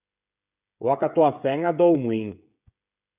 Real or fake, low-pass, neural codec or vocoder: fake; 3.6 kHz; codec, 16 kHz, 16 kbps, FreqCodec, smaller model